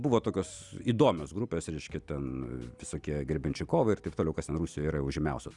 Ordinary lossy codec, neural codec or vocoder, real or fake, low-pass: Opus, 64 kbps; none; real; 10.8 kHz